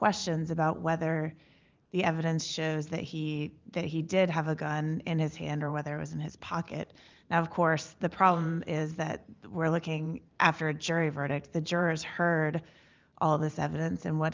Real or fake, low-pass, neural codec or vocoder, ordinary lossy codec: fake; 7.2 kHz; vocoder, 44.1 kHz, 128 mel bands every 512 samples, BigVGAN v2; Opus, 24 kbps